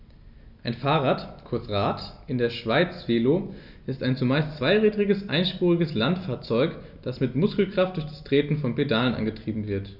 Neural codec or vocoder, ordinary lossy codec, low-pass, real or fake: none; none; 5.4 kHz; real